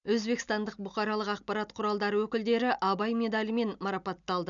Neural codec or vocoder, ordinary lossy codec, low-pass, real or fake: none; none; 7.2 kHz; real